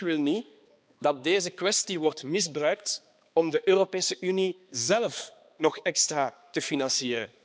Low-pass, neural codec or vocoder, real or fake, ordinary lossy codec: none; codec, 16 kHz, 2 kbps, X-Codec, HuBERT features, trained on balanced general audio; fake; none